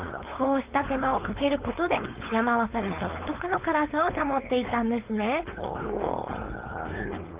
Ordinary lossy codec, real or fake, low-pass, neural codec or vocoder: Opus, 24 kbps; fake; 3.6 kHz; codec, 16 kHz, 4.8 kbps, FACodec